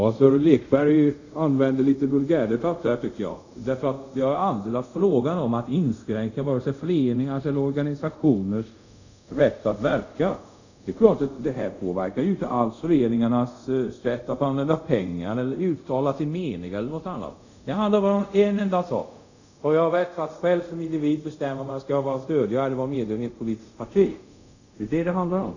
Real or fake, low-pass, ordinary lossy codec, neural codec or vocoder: fake; 7.2 kHz; none; codec, 24 kHz, 0.5 kbps, DualCodec